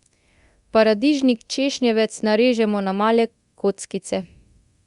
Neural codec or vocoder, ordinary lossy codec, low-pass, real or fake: codec, 24 kHz, 0.9 kbps, DualCodec; Opus, 64 kbps; 10.8 kHz; fake